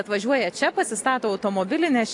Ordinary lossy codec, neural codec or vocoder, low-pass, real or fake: AAC, 48 kbps; none; 10.8 kHz; real